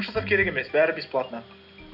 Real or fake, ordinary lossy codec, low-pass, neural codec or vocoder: real; none; 5.4 kHz; none